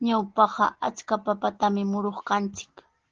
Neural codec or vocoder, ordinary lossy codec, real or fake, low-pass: none; Opus, 16 kbps; real; 7.2 kHz